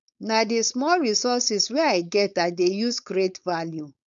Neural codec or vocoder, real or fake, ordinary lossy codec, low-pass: codec, 16 kHz, 4.8 kbps, FACodec; fake; none; 7.2 kHz